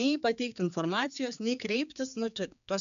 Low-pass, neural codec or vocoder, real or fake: 7.2 kHz; codec, 16 kHz, 4 kbps, X-Codec, HuBERT features, trained on general audio; fake